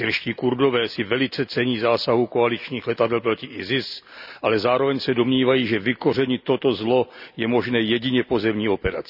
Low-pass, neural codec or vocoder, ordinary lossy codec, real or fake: 5.4 kHz; none; none; real